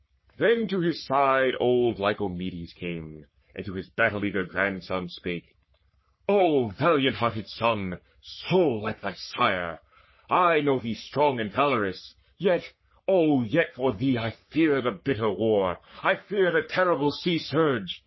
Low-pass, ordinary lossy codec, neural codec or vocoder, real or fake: 7.2 kHz; MP3, 24 kbps; codec, 44.1 kHz, 3.4 kbps, Pupu-Codec; fake